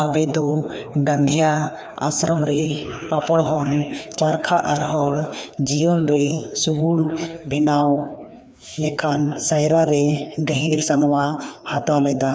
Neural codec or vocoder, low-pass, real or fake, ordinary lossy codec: codec, 16 kHz, 2 kbps, FreqCodec, larger model; none; fake; none